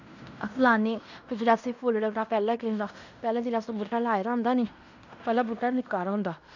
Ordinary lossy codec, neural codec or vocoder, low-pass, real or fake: none; codec, 16 kHz in and 24 kHz out, 0.9 kbps, LongCat-Audio-Codec, fine tuned four codebook decoder; 7.2 kHz; fake